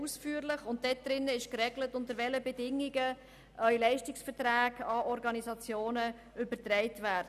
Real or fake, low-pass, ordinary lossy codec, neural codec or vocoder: real; 14.4 kHz; none; none